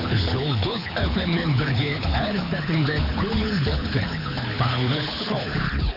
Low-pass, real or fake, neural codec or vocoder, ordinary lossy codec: 5.4 kHz; fake; codec, 24 kHz, 6 kbps, HILCodec; AAC, 32 kbps